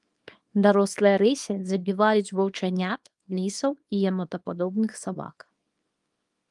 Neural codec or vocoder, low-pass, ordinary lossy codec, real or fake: codec, 24 kHz, 0.9 kbps, WavTokenizer, small release; 10.8 kHz; Opus, 32 kbps; fake